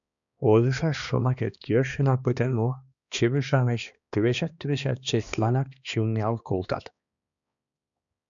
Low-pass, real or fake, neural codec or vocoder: 7.2 kHz; fake; codec, 16 kHz, 2 kbps, X-Codec, HuBERT features, trained on balanced general audio